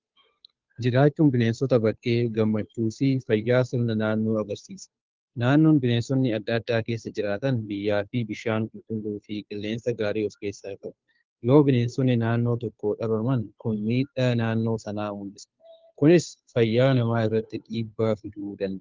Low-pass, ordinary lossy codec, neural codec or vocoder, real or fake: 7.2 kHz; Opus, 32 kbps; codec, 16 kHz, 2 kbps, FunCodec, trained on Chinese and English, 25 frames a second; fake